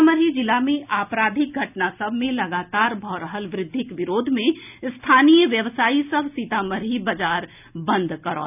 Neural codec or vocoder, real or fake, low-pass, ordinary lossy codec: none; real; 3.6 kHz; none